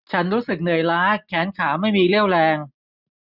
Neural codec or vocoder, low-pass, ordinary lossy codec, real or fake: none; 5.4 kHz; none; real